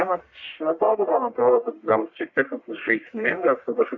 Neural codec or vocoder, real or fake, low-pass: codec, 44.1 kHz, 1.7 kbps, Pupu-Codec; fake; 7.2 kHz